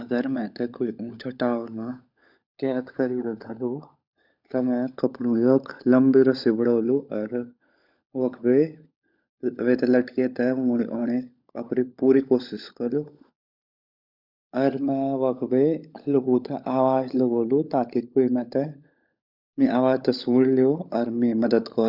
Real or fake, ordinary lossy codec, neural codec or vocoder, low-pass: fake; none; codec, 16 kHz, 16 kbps, FunCodec, trained on LibriTTS, 50 frames a second; 5.4 kHz